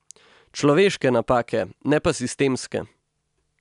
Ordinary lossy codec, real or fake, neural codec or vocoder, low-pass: none; real; none; 10.8 kHz